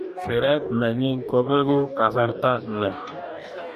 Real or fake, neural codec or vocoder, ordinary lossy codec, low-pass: fake; codec, 44.1 kHz, 2.6 kbps, DAC; AAC, 96 kbps; 14.4 kHz